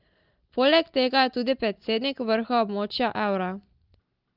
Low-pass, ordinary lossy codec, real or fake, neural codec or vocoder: 5.4 kHz; Opus, 32 kbps; real; none